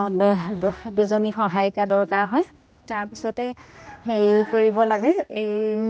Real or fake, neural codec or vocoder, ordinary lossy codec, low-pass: fake; codec, 16 kHz, 1 kbps, X-Codec, HuBERT features, trained on general audio; none; none